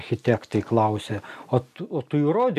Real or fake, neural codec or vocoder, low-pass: real; none; 14.4 kHz